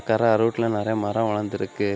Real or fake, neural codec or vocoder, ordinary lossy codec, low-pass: real; none; none; none